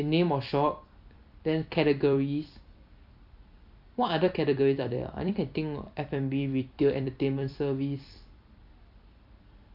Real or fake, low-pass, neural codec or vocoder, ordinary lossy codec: fake; 5.4 kHz; vocoder, 44.1 kHz, 128 mel bands every 256 samples, BigVGAN v2; AAC, 48 kbps